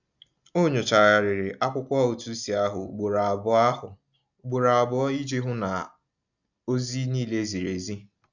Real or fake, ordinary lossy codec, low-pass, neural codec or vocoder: real; none; 7.2 kHz; none